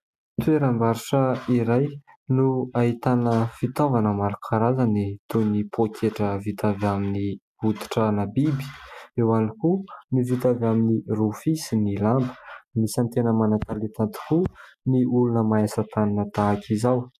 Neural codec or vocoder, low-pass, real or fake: none; 14.4 kHz; real